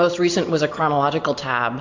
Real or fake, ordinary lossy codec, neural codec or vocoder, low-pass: real; AAC, 48 kbps; none; 7.2 kHz